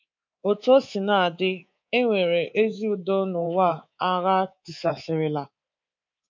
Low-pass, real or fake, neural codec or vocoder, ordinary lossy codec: 7.2 kHz; fake; codec, 24 kHz, 3.1 kbps, DualCodec; MP3, 48 kbps